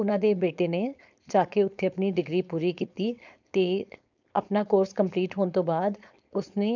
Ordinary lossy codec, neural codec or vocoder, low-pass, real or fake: none; codec, 16 kHz, 4.8 kbps, FACodec; 7.2 kHz; fake